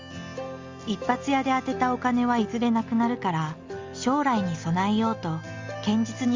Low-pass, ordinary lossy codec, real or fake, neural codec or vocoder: 7.2 kHz; Opus, 32 kbps; real; none